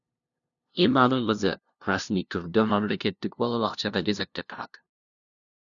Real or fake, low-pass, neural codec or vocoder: fake; 7.2 kHz; codec, 16 kHz, 0.5 kbps, FunCodec, trained on LibriTTS, 25 frames a second